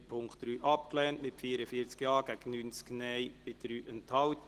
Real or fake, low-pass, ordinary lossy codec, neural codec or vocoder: real; 14.4 kHz; Opus, 16 kbps; none